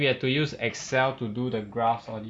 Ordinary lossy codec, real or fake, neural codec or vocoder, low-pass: none; real; none; 9.9 kHz